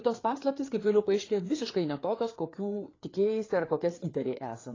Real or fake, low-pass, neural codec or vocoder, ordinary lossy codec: fake; 7.2 kHz; codec, 16 kHz, 4 kbps, FreqCodec, larger model; AAC, 32 kbps